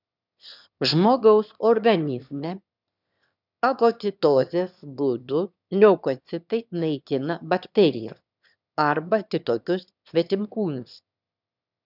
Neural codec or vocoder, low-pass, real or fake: autoencoder, 22.05 kHz, a latent of 192 numbers a frame, VITS, trained on one speaker; 5.4 kHz; fake